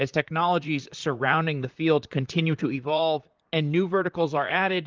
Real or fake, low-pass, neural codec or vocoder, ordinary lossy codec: fake; 7.2 kHz; vocoder, 44.1 kHz, 128 mel bands, Pupu-Vocoder; Opus, 24 kbps